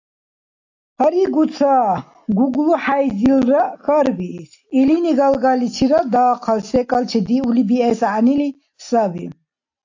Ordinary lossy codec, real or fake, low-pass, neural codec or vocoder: AAC, 48 kbps; real; 7.2 kHz; none